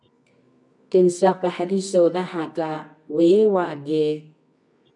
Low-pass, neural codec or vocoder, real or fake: 10.8 kHz; codec, 24 kHz, 0.9 kbps, WavTokenizer, medium music audio release; fake